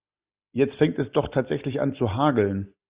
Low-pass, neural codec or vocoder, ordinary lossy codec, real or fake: 3.6 kHz; none; Opus, 64 kbps; real